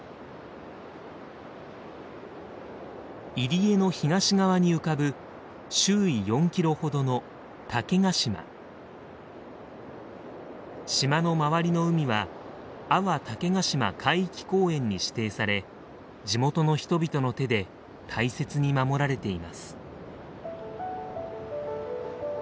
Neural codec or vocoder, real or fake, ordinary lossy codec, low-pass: none; real; none; none